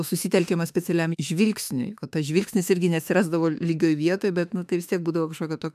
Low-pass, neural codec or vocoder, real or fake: 14.4 kHz; autoencoder, 48 kHz, 32 numbers a frame, DAC-VAE, trained on Japanese speech; fake